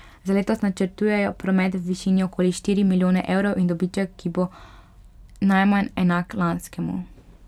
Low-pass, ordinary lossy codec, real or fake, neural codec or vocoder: 19.8 kHz; none; fake; vocoder, 44.1 kHz, 128 mel bands every 256 samples, BigVGAN v2